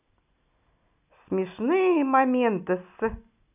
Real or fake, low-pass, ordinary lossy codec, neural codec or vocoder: real; 3.6 kHz; none; none